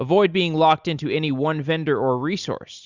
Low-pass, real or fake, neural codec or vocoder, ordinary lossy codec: 7.2 kHz; real; none; Opus, 64 kbps